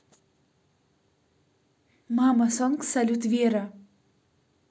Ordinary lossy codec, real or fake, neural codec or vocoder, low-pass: none; real; none; none